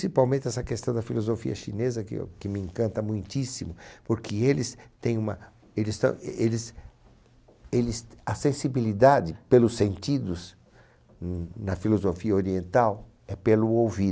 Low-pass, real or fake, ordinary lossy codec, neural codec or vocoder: none; real; none; none